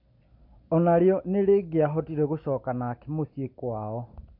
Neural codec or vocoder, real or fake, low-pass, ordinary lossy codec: none; real; 5.4 kHz; MP3, 48 kbps